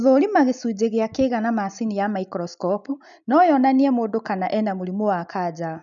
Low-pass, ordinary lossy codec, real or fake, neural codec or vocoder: 7.2 kHz; none; real; none